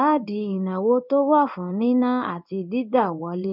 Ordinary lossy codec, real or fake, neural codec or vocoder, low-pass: none; fake; codec, 16 kHz in and 24 kHz out, 1 kbps, XY-Tokenizer; 5.4 kHz